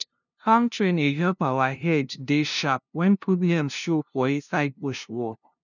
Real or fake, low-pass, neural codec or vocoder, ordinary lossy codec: fake; 7.2 kHz; codec, 16 kHz, 0.5 kbps, FunCodec, trained on LibriTTS, 25 frames a second; none